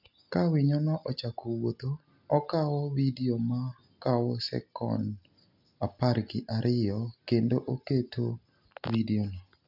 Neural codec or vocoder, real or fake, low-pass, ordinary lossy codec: none; real; 5.4 kHz; none